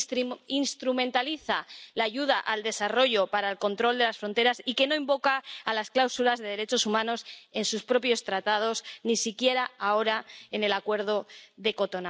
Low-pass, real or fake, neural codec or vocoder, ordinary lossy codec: none; real; none; none